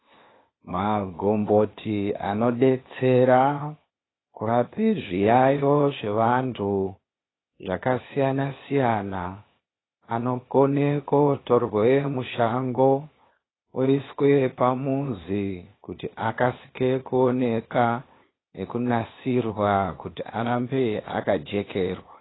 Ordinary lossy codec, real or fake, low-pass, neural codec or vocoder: AAC, 16 kbps; fake; 7.2 kHz; codec, 16 kHz, 0.8 kbps, ZipCodec